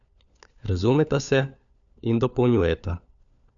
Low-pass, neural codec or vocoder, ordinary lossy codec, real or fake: 7.2 kHz; codec, 16 kHz, 4 kbps, FunCodec, trained on LibriTTS, 50 frames a second; none; fake